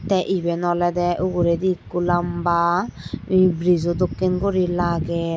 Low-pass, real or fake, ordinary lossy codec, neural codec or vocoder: none; real; none; none